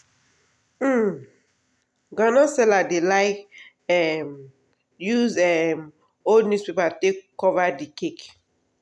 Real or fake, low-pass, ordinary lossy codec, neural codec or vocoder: real; none; none; none